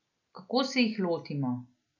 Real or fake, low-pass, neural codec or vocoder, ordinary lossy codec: real; 7.2 kHz; none; none